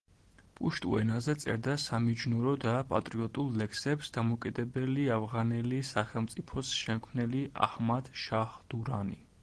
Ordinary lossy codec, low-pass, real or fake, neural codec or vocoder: Opus, 16 kbps; 10.8 kHz; real; none